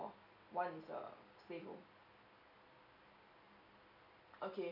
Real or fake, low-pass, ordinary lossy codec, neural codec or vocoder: real; 5.4 kHz; none; none